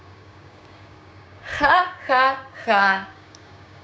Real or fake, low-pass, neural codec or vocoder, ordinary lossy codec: fake; none; codec, 16 kHz, 6 kbps, DAC; none